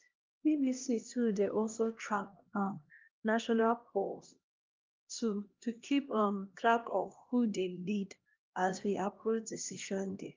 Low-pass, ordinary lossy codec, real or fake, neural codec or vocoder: 7.2 kHz; Opus, 24 kbps; fake; codec, 16 kHz, 1 kbps, X-Codec, HuBERT features, trained on LibriSpeech